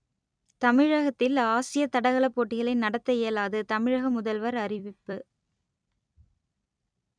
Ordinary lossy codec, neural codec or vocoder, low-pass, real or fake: none; vocoder, 44.1 kHz, 128 mel bands every 256 samples, BigVGAN v2; 9.9 kHz; fake